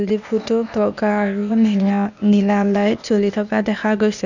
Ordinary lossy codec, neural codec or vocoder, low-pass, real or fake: none; codec, 16 kHz, 0.8 kbps, ZipCodec; 7.2 kHz; fake